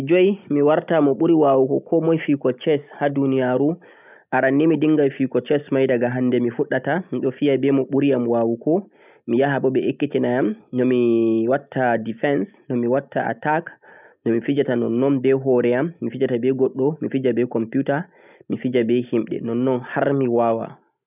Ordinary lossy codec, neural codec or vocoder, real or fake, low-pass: none; none; real; 3.6 kHz